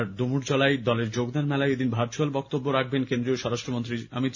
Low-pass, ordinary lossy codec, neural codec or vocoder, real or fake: 7.2 kHz; none; none; real